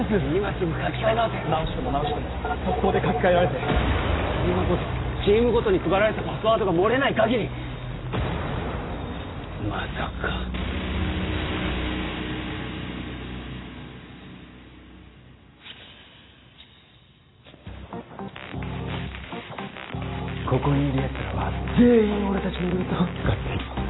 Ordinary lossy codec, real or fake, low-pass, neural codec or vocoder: AAC, 16 kbps; real; 7.2 kHz; none